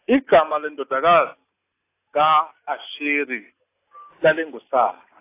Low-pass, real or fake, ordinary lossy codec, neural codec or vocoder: 3.6 kHz; real; AAC, 24 kbps; none